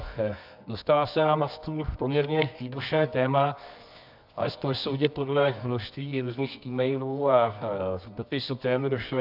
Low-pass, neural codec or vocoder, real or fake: 5.4 kHz; codec, 24 kHz, 0.9 kbps, WavTokenizer, medium music audio release; fake